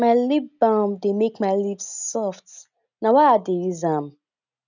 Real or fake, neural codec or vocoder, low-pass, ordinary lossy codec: real; none; 7.2 kHz; none